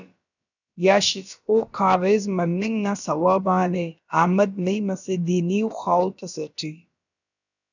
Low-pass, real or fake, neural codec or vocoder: 7.2 kHz; fake; codec, 16 kHz, about 1 kbps, DyCAST, with the encoder's durations